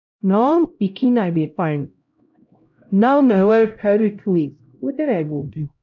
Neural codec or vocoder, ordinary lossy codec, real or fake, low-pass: codec, 16 kHz, 0.5 kbps, X-Codec, HuBERT features, trained on LibriSpeech; MP3, 64 kbps; fake; 7.2 kHz